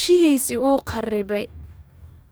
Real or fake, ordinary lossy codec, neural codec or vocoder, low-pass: fake; none; codec, 44.1 kHz, 2.6 kbps, DAC; none